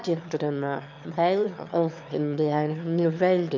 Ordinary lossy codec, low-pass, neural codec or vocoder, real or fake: MP3, 64 kbps; 7.2 kHz; autoencoder, 22.05 kHz, a latent of 192 numbers a frame, VITS, trained on one speaker; fake